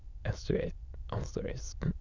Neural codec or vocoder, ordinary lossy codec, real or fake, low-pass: autoencoder, 22.05 kHz, a latent of 192 numbers a frame, VITS, trained on many speakers; Opus, 64 kbps; fake; 7.2 kHz